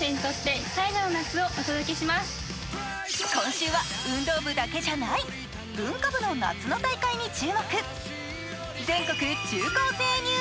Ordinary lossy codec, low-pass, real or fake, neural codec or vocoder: none; none; real; none